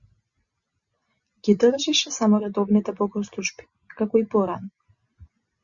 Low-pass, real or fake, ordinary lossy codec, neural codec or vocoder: 7.2 kHz; real; Opus, 64 kbps; none